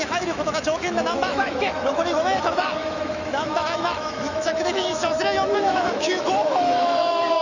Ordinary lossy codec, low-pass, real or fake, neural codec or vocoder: none; 7.2 kHz; fake; autoencoder, 48 kHz, 128 numbers a frame, DAC-VAE, trained on Japanese speech